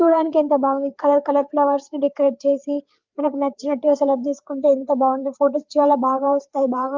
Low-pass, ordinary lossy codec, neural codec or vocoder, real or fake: 7.2 kHz; Opus, 32 kbps; codec, 16 kHz, 4 kbps, FreqCodec, larger model; fake